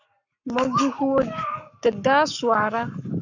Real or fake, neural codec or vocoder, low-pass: fake; codec, 44.1 kHz, 7.8 kbps, Pupu-Codec; 7.2 kHz